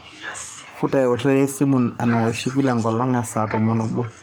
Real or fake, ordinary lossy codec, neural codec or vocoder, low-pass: fake; none; codec, 44.1 kHz, 3.4 kbps, Pupu-Codec; none